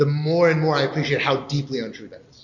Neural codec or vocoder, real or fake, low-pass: none; real; 7.2 kHz